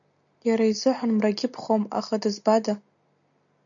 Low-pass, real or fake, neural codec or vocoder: 7.2 kHz; real; none